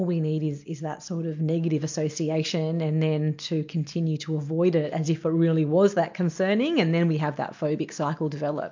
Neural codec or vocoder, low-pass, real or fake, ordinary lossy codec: none; 7.2 kHz; real; MP3, 48 kbps